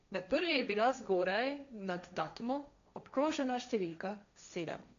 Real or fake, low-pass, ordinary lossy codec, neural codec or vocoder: fake; 7.2 kHz; AAC, 96 kbps; codec, 16 kHz, 1.1 kbps, Voila-Tokenizer